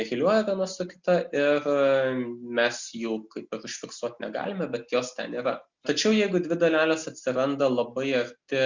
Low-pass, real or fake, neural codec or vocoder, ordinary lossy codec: 7.2 kHz; real; none; Opus, 64 kbps